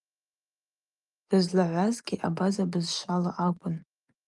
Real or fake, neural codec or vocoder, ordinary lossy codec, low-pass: fake; vocoder, 24 kHz, 100 mel bands, Vocos; Opus, 32 kbps; 10.8 kHz